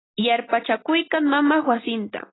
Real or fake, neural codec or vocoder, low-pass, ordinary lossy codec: real; none; 7.2 kHz; AAC, 16 kbps